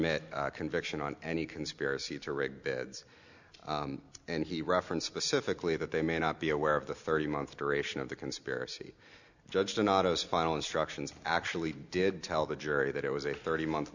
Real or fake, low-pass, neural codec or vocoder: real; 7.2 kHz; none